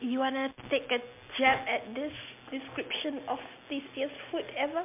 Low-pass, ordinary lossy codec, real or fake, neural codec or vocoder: 3.6 kHz; MP3, 32 kbps; real; none